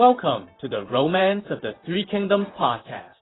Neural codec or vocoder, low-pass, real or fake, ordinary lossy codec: vocoder, 44.1 kHz, 128 mel bands, Pupu-Vocoder; 7.2 kHz; fake; AAC, 16 kbps